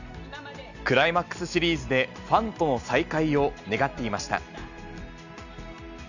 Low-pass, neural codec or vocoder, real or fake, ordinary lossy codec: 7.2 kHz; none; real; none